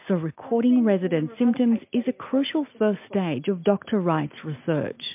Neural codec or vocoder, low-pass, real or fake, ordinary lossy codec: none; 3.6 kHz; real; MP3, 32 kbps